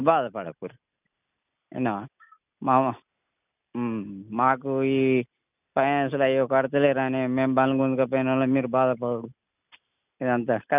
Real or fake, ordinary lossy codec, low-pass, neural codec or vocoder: real; none; 3.6 kHz; none